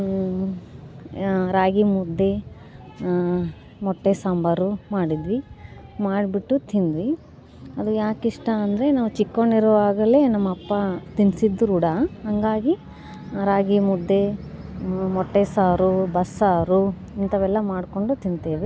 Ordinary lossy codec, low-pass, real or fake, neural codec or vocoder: none; none; real; none